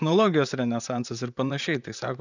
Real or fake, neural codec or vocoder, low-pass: fake; vocoder, 44.1 kHz, 128 mel bands, Pupu-Vocoder; 7.2 kHz